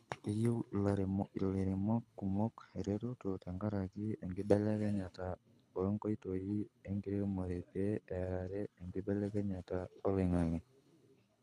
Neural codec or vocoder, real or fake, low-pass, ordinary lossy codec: codec, 24 kHz, 6 kbps, HILCodec; fake; none; none